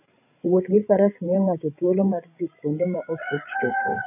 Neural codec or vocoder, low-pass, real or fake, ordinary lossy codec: codec, 16 kHz, 16 kbps, FreqCodec, larger model; 3.6 kHz; fake; none